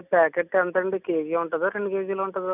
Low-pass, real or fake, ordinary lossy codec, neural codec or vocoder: 3.6 kHz; real; none; none